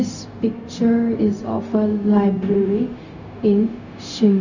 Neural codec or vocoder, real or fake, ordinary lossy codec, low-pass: codec, 16 kHz, 0.4 kbps, LongCat-Audio-Codec; fake; none; 7.2 kHz